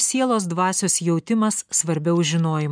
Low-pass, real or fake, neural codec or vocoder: 9.9 kHz; real; none